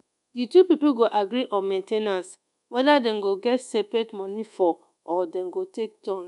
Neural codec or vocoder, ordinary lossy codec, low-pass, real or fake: codec, 24 kHz, 1.2 kbps, DualCodec; none; 10.8 kHz; fake